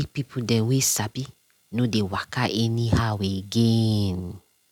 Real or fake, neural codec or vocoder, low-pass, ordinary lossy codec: real; none; 19.8 kHz; none